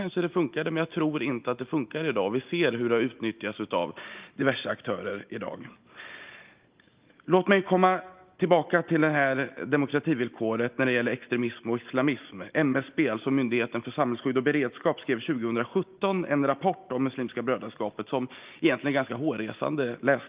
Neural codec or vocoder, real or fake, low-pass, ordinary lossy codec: none; real; 3.6 kHz; Opus, 32 kbps